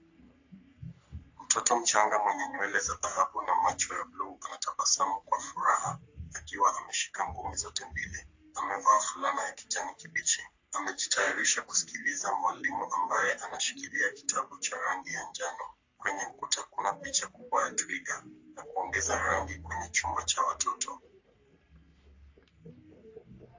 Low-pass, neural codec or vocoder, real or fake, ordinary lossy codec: 7.2 kHz; codec, 44.1 kHz, 3.4 kbps, Pupu-Codec; fake; AAC, 48 kbps